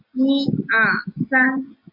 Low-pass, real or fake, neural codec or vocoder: 5.4 kHz; real; none